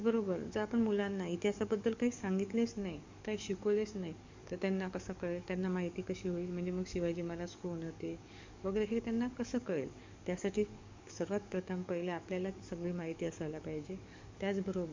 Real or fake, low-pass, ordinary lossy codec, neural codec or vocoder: fake; 7.2 kHz; MP3, 64 kbps; codec, 44.1 kHz, 7.8 kbps, DAC